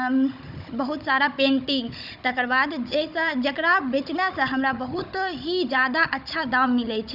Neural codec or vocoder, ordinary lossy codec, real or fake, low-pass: codec, 16 kHz, 16 kbps, FunCodec, trained on Chinese and English, 50 frames a second; none; fake; 5.4 kHz